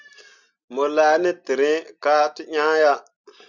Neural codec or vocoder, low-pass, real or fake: none; 7.2 kHz; real